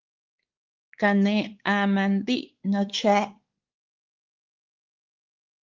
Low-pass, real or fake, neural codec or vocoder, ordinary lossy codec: 7.2 kHz; fake; codec, 16 kHz, 4 kbps, X-Codec, WavLM features, trained on Multilingual LibriSpeech; Opus, 16 kbps